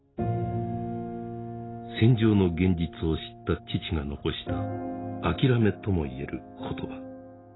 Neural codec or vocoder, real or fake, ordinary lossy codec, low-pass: none; real; AAC, 16 kbps; 7.2 kHz